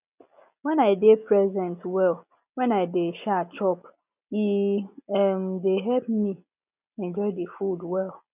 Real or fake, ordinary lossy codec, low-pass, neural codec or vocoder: real; none; 3.6 kHz; none